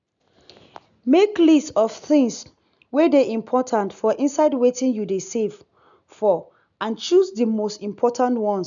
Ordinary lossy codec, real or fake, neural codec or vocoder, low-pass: none; real; none; 7.2 kHz